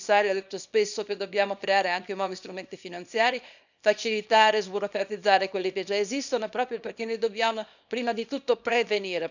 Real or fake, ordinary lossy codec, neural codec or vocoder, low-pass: fake; none; codec, 24 kHz, 0.9 kbps, WavTokenizer, small release; 7.2 kHz